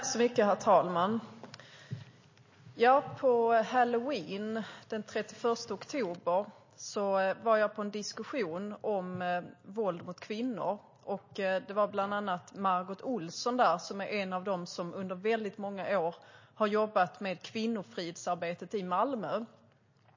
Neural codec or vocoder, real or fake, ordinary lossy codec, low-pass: none; real; MP3, 32 kbps; 7.2 kHz